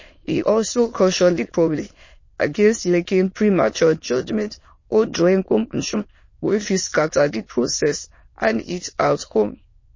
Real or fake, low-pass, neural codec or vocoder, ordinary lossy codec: fake; 7.2 kHz; autoencoder, 22.05 kHz, a latent of 192 numbers a frame, VITS, trained on many speakers; MP3, 32 kbps